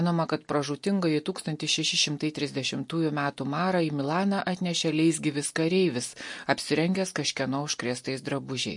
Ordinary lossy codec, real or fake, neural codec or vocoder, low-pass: MP3, 48 kbps; real; none; 10.8 kHz